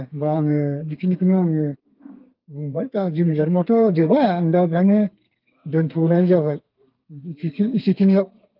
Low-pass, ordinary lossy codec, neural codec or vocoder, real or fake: 5.4 kHz; Opus, 24 kbps; codec, 32 kHz, 1.9 kbps, SNAC; fake